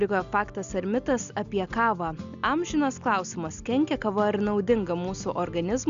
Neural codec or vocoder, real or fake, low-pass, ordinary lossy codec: none; real; 7.2 kHz; Opus, 64 kbps